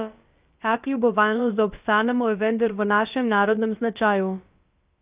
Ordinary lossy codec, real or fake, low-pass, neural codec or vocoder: Opus, 24 kbps; fake; 3.6 kHz; codec, 16 kHz, about 1 kbps, DyCAST, with the encoder's durations